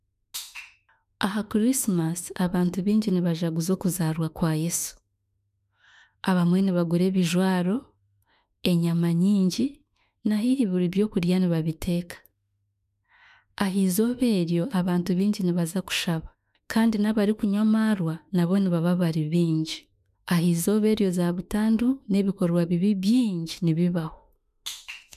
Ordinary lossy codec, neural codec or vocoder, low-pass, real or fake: none; autoencoder, 48 kHz, 32 numbers a frame, DAC-VAE, trained on Japanese speech; 14.4 kHz; fake